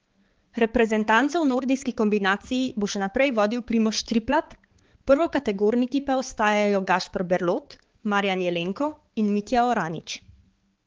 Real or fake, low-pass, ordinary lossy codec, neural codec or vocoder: fake; 7.2 kHz; Opus, 16 kbps; codec, 16 kHz, 4 kbps, X-Codec, HuBERT features, trained on balanced general audio